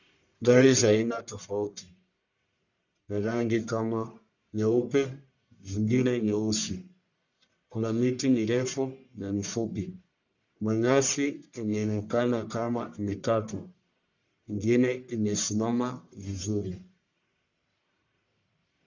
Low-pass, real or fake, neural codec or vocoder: 7.2 kHz; fake; codec, 44.1 kHz, 1.7 kbps, Pupu-Codec